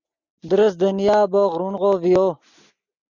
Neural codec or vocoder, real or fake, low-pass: none; real; 7.2 kHz